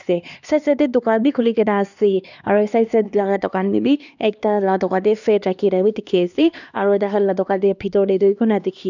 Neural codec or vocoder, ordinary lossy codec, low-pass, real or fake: codec, 16 kHz, 2 kbps, X-Codec, HuBERT features, trained on LibriSpeech; none; 7.2 kHz; fake